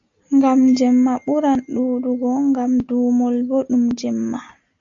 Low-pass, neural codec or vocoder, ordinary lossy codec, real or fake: 7.2 kHz; none; AAC, 64 kbps; real